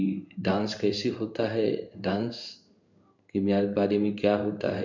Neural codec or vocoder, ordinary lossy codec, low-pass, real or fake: codec, 16 kHz in and 24 kHz out, 1 kbps, XY-Tokenizer; none; 7.2 kHz; fake